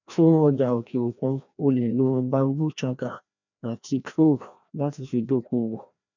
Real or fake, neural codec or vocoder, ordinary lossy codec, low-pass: fake; codec, 16 kHz, 1 kbps, FreqCodec, larger model; none; 7.2 kHz